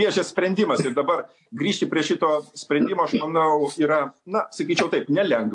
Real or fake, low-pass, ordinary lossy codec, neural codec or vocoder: real; 10.8 kHz; AAC, 64 kbps; none